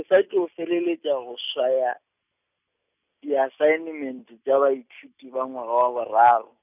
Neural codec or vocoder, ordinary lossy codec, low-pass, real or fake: none; none; 3.6 kHz; real